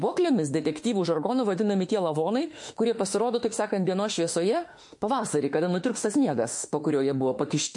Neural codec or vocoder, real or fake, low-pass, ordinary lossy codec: autoencoder, 48 kHz, 32 numbers a frame, DAC-VAE, trained on Japanese speech; fake; 10.8 kHz; MP3, 48 kbps